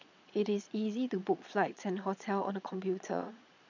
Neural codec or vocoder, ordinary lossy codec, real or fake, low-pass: vocoder, 22.05 kHz, 80 mel bands, Vocos; none; fake; 7.2 kHz